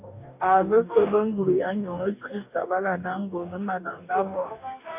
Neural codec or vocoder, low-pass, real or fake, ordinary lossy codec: codec, 44.1 kHz, 2.6 kbps, DAC; 3.6 kHz; fake; AAC, 32 kbps